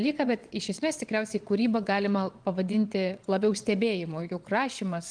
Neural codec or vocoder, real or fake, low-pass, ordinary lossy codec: vocoder, 22.05 kHz, 80 mel bands, WaveNeXt; fake; 9.9 kHz; Opus, 24 kbps